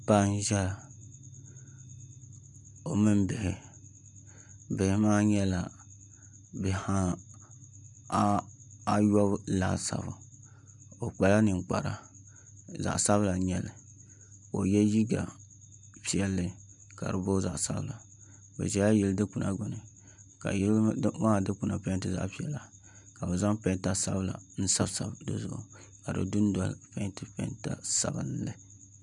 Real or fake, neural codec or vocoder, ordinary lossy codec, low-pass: real; none; MP3, 96 kbps; 10.8 kHz